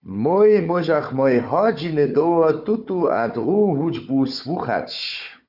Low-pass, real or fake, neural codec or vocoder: 5.4 kHz; fake; codec, 16 kHz, 4 kbps, FunCodec, trained on Chinese and English, 50 frames a second